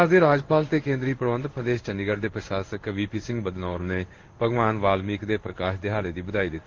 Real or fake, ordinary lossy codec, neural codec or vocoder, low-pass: fake; Opus, 16 kbps; codec, 16 kHz in and 24 kHz out, 1 kbps, XY-Tokenizer; 7.2 kHz